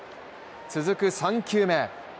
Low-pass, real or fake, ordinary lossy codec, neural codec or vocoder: none; real; none; none